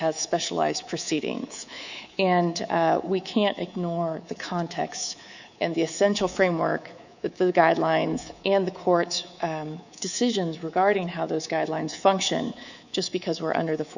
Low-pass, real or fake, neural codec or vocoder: 7.2 kHz; fake; codec, 24 kHz, 3.1 kbps, DualCodec